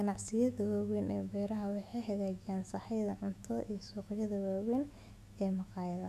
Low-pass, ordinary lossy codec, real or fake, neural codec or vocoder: 14.4 kHz; none; fake; autoencoder, 48 kHz, 128 numbers a frame, DAC-VAE, trained on Japanese speech